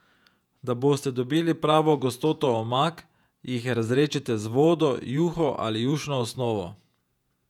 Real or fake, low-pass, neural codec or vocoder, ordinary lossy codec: fake; 19.8 kHz; vocoder, 48 kHz, 128 mel bands, Vocos; none